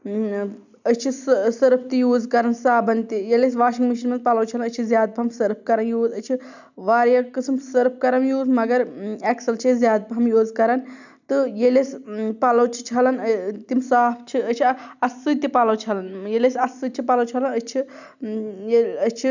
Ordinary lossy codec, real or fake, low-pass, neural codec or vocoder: none; real; 7.2 kHz; none